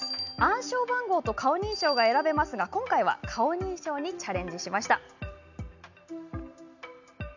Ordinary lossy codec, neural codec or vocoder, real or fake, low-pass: none; none; real; 7.2 kHz